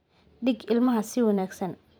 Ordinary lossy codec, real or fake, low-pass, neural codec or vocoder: none; fake; none; vocoder, 44.1 kHz, 128 mel bands every 512 samples, BigVGAN v2